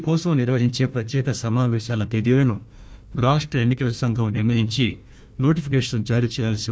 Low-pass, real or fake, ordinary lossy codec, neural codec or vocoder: none; fake; none; codec, 16 kHz, 1 kbps, FunCodec, trained on Chinese and English, 50 frames a second